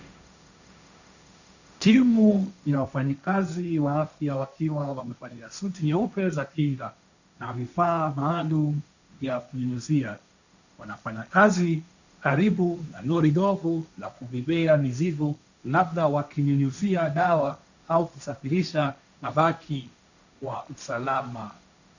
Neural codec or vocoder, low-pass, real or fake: codec, 16 kHz, 1.1 kbps, Voila-Tokenizer; 7.2 kHz; fake